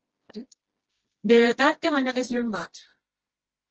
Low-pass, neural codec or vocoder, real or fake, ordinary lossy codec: 7.2 kHz; codec, 16 kHz, 1 kbps, FreqCodec, smaller model; fake; Opus, 16 kbps